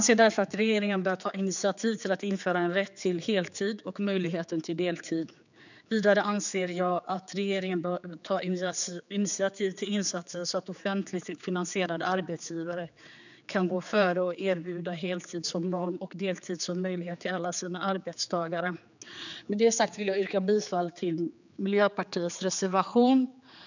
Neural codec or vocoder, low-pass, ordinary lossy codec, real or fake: codec, 16 kHz, 4 kbps, X-Codec, HuBERT features, trained on general audio; 7.2 kHz; none; fake